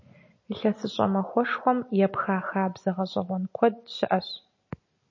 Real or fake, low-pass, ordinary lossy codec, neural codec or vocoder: real; 7.2 kHz; MP3, 32 kbps; none